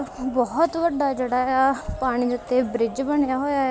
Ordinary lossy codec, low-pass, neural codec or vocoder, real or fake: none; none; none; real